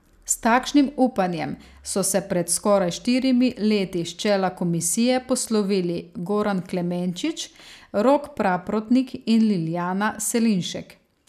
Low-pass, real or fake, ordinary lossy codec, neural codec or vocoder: 14.4 kHz; real; none; none